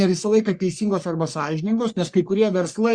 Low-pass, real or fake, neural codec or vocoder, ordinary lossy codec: 9.9 kHz; fake; codec, 44.1 kHz, 3.4 kbps, Pupu-Codec; Opus, 64 kbps